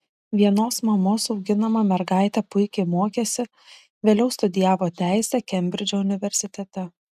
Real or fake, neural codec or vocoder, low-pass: real; none; 14.4 kHz